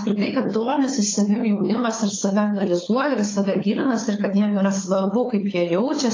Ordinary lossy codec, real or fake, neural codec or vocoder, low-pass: AAC, 32 kbps; fake; codec, 16 kHz, 4 kbps, FunCodec, trained on LibriTTS, 50 frames a second; 7.2 kHz